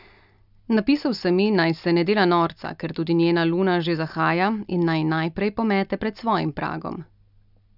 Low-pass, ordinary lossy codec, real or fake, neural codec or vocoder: 5.4 kHz; none; real; none